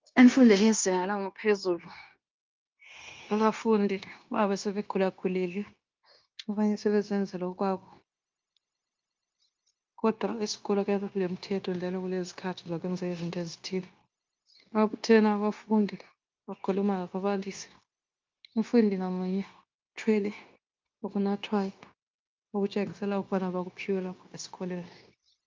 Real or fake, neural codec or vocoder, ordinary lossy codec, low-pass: fake; codec, 16 kHz, 0.9 kbps, LongCat-Audio-Codec; Opus, 24 kbps; 7.2 kHz